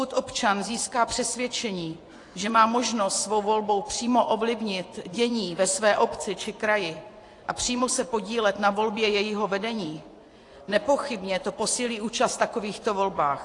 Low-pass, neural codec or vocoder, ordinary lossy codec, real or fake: 10.8 kHz; vocoder, 24 kHz, 100 mel bands, Vocos; AAC, 48 kbps; fake